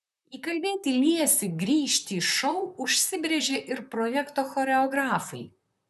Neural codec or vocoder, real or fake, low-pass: vocoder, 44.1 kHz, 128 mel bands, Pupu-Vocoder; fake; 14.4 kHz